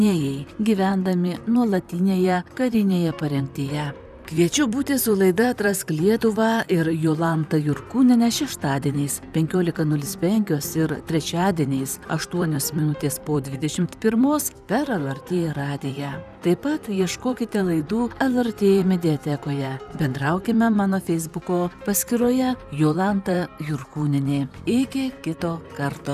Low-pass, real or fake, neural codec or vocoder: 14.4 kHz; fake; vocoder, 44.1 kHz, 128 mel bands, Pupu-Vocoder